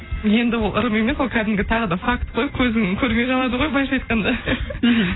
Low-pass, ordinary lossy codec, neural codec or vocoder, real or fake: 7.2 kHz; AAC, 16 kbps; none; real